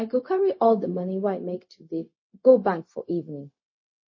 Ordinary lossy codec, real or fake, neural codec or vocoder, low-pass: MP3, 32 kbps; fake; codec, 16 kHz, 0.4 kbps, LongCat-Audio-Codec; 7.2 kHz